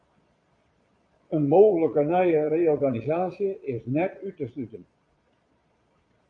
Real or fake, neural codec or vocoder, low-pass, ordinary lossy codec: fake; vocoder, 22.05 kHz, 80 mel bands, Vocos; 9.9 kHz; MP3, 64 kbps